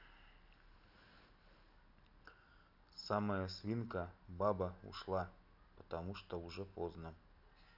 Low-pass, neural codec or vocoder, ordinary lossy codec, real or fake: 5.4 kHz; none; none; real